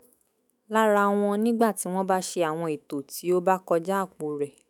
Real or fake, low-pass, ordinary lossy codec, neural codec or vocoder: fake; none; none; autoencoder, 48 kHz, 128 numbers a frame, DAC-VAE, trained on Japanese speech